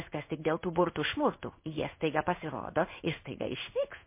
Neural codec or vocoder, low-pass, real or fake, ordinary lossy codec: codec, 16 kHz in and 24 kHz out, 1 kbps, XY-Tokenizer; 3.6 kHz; fake; MP3, 24 kbps